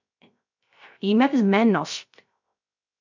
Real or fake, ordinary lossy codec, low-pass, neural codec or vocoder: fake; MP3, 64 kbps; 7.2 kHz; codec, 16 kHz, 0.3 kbps, FocalCodec